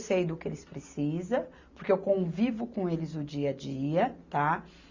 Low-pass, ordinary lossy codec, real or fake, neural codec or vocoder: 7.2 kHz; Opus, 64 kbps; real; none